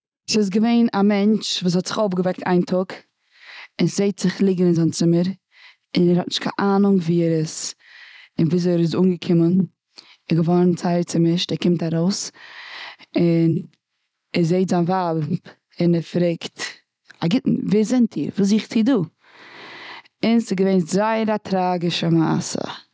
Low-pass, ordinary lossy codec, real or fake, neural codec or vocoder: none; none; real; none